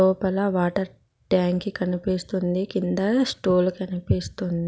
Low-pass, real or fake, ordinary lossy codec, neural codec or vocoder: none; real; none; none